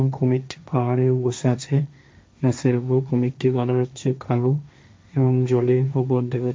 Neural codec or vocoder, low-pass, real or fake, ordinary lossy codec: codec, 16 kHz, 1.1 kbps, Voila-Tokenizer; none; fake; none